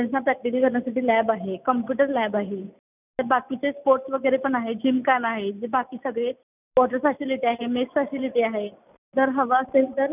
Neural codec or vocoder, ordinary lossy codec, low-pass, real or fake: none; none; 3.6 kHz; real